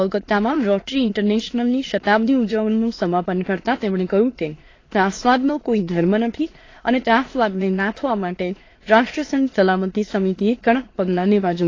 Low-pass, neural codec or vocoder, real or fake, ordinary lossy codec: 7.2 kHz; autoencoder, 22.05 kHz, a latent of 192 numbers a frame, VITS, trained on many speakers; fake; AAC, 32 kbps